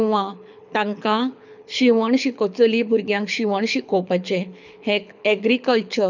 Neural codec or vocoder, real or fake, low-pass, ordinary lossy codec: codec, 24 kHz, 6 kbps, HILCodec; fake; 7.2 kHz; none